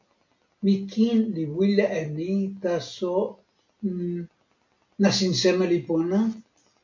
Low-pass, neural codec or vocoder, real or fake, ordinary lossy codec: 7.2 kHz; none; real; MP3, 48 kbps